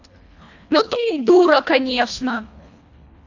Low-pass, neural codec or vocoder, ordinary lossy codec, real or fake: 7.2 kHz; codec, 24 kHz, 1.5 kbps, HILCodec; AAC, 48 kbps; fake